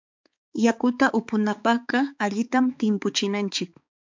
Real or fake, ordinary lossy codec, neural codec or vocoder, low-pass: fake; MP3, 64 kbps; codec, 16 kHz, 4 kbps, X-Codec, HuBERT features, trained on balanced general audio; 7.2 kHz